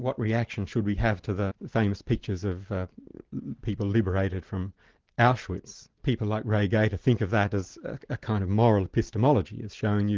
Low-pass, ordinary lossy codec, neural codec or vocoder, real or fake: 7.2 kHz; Opus, 16 kbps; none; real